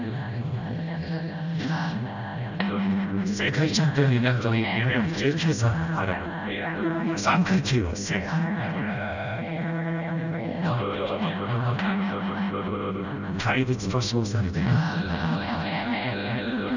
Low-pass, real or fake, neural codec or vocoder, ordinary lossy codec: 7.2 kHz; fake; codec, 16 kHz, 0.5 kbps, FreqCodec, smaller model; none